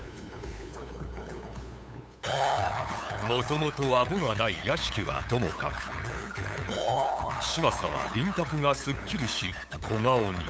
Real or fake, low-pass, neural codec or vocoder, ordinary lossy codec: fake; none; codec, 16 kHz, 8 kbps, FunCodec, trained on LibriTTS, 25 frames a second; none